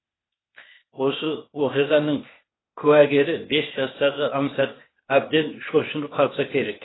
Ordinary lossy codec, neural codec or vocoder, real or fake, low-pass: AAC, 16 kbps; codec, 16 kHz, 0.8 kbps, ZipCodec; fake; 7.2 kHz